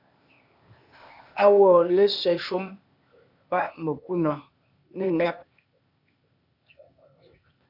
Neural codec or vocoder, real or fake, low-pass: codec, 16 kHz, 0.8 kbps, ZipCodec; fake; 5.4 kHz